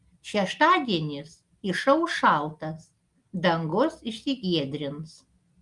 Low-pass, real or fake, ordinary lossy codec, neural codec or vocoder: 10.8 kHz; real; Opus, 32 kbps; none